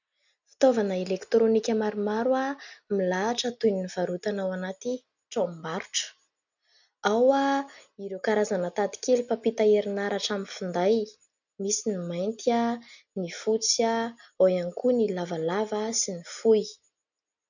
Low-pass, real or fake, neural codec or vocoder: 7.2 kHz; real; none